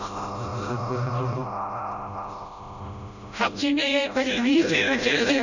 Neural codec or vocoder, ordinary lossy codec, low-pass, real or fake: codec, 16 kHz, 0.5 kbps, FreqCodec, smaller model; none; 7.2 kHz; fake